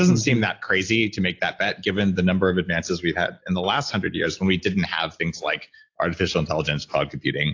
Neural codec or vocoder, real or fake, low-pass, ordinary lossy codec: none; real; 7.2 kHz; AAC, 48 kbps